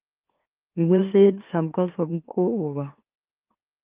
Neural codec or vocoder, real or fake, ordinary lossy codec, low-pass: autoencoder, 44.1 kHz, a latent of 192 numbers a frame, MeloTTS; fake; Opus, 24 kbps; 3.6 kHz